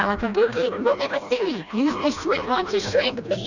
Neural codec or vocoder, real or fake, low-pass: codec, 16 kHz, 1 kbps, FreqCodec, smaller model; fake; 7.2 kHz